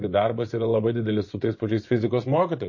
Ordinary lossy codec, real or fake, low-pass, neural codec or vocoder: MP3, 32 kbps; fake; 7.2 kHz; vocoder, 24 kHz, 100 mel bands, Vocos